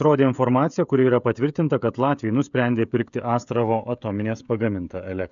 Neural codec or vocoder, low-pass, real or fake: codec, 16 kHz, 16 kbps, FreqCodec, smaller model; 7.2 kHz; fake